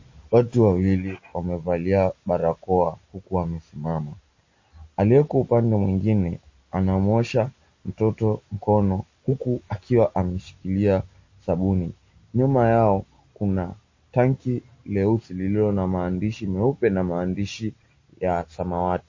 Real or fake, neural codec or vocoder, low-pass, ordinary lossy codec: real; none; 7.2 kHz; MP3, 32 kbps